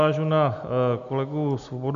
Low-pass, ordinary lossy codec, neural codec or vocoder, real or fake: 7.2 kHz; AAC, 64 kbps; none; real